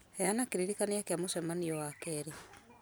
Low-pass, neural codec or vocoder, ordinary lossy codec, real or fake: none; none; none; real